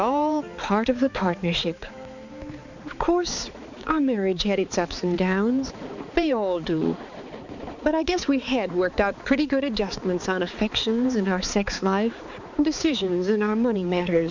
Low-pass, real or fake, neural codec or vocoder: 7.2 kHz; fake; codec, 16 kHz, 4 kbps, X-Codec, HuBERT features, trained on balanced general audio